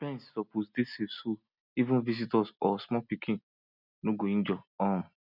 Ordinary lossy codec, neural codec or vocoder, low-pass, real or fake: none; none; 5.4 kHz; real